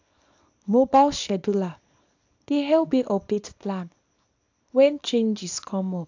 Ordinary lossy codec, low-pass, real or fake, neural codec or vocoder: AAC, 48 kbps; 7.2 kHz; fake; codec, 24 kHz, 0.9 kbps, WavTokenizer, small release